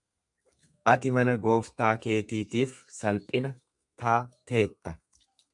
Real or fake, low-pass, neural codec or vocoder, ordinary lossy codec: fake; 10.8 kHz; codec, 32 kHz, 1.9 kbps, SNAC; MP3, 96 kbps